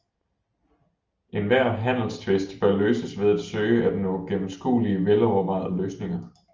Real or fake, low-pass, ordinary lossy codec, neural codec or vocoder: real; 7.2 kHz; Opus, 24 kbps; none